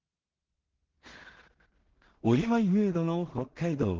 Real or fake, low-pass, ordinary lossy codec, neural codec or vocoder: fake; 7.2 kHz; Opus, 16 kbps; codec, 16 kHz in and 24 kHz out, 0.4 kbps, LongCat-Audio-Codec, two codebook decoder